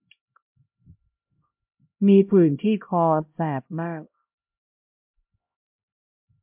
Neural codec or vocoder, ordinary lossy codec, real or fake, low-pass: codec, 16 kHz, 0.5 kbps, X-Codec, HuBERT features, trained on LibriSpeech; MP3, 32 kbps; fake; 3.6 kHz